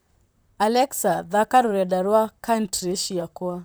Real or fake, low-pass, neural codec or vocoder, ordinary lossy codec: fake; none; vocoder, 44.1 kHz, 128 mel bands, Pupu-Vocoder; none